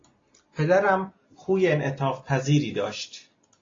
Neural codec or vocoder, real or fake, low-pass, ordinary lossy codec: none; real; 7.2 kHz; AAC, 32 kbps